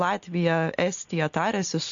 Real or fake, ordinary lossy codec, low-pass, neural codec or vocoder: real; MP3, 48 kbps; 7.2 kHz; none